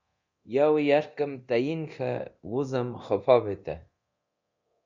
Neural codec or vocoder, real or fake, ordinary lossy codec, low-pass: codec, 24 kHz, 0.9 kbps, DualCodec; fake; Opus, 64 kbps; 7.2 kHz